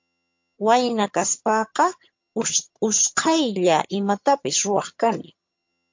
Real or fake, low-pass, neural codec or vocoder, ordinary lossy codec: fake; 7.2 kHz; vocoder, 22.05 kHz, 80 mel bands, HiFi-GAN; MP3, 48 kbps